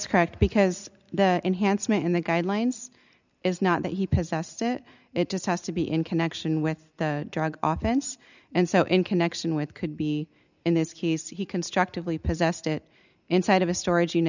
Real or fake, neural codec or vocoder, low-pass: real; none; 7.2 kHz